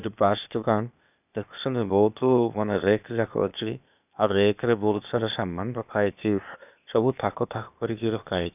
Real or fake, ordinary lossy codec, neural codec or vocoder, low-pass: fake; none; codec, 16 kHz, 0.8 kbps, ZipCodec; 3.6 kHz